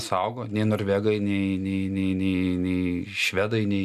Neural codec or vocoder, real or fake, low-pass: none; real; 14.4 kHz